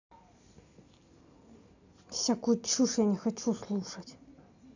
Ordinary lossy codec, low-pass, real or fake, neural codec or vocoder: none; 7.2 kHz; real; none